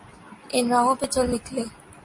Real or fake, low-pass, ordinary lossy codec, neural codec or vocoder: real; 10.8 kHz; MP3, 48 kbps; none